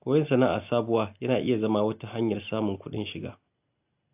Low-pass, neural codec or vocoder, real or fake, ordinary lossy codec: 3.6 kHz; none; real; AAC, 32 kbps